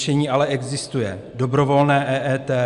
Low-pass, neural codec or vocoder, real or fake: 10.8 kHz; none; real